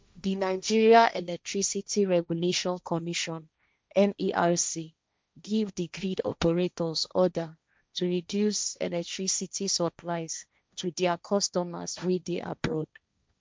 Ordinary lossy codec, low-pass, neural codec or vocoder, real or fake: none; none; codec, 16 kHz, 1.1 kbps, Voila-Tokenizer; fake